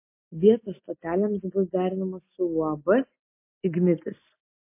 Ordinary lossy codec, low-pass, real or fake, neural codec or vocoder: MP3, 24 kbps; 3.6 kHz; real; none